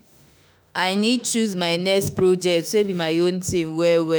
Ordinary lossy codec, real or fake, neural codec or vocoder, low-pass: none; fake; autoencoder, 48 kHz, 32 numbers a frame, DAC-VAE, trained on Japanese speech; none